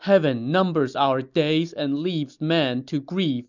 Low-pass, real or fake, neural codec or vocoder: 7.2 kHz; real; none